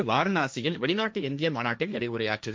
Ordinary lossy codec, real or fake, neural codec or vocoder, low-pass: none; fake; codec, 16 kHz, 1.1 kbps, Voila-Tokenizer; none